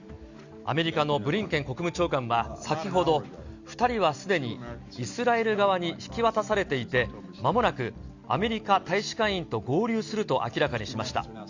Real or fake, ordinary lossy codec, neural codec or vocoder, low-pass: real; Opus, 64 kbps; none; 7.2 kHz